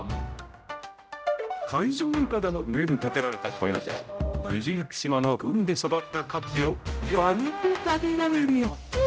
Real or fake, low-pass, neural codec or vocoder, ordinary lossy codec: fake; none; codec, 16 kHz, 0.5 kbps, X-Codec, HuBERT features, trained on general audio; none